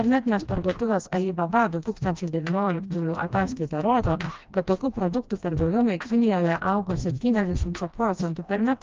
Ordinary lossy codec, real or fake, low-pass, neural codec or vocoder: Opus, 24 kbps; fake; 7.2 kHz; codec, 16 kHz, 1 kbps, FreqCodec, smaller model